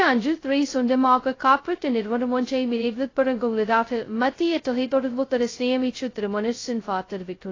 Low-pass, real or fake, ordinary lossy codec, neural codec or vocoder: 7.2 kHz; fake; AAC, 32 kbps; codec, 16 kHz, 0.2 kbps, FocalCodec